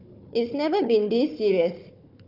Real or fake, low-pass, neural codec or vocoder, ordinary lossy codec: fake; 5.4 kHz; codec, 16 kHz, 4 kbps, FunCodec, trained on Chinese and English, 50 frames a second; none